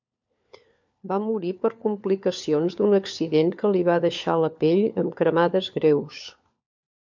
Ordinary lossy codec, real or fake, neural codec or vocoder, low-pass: MP3, 64 kbps; fake; codec, 16 kHz, 4 kbps, FunCodec, trained on LibriTTS, 50 frames a second; 7.2 kHz